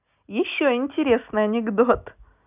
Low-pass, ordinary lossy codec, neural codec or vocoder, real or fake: 3.6 kHz; none; none; real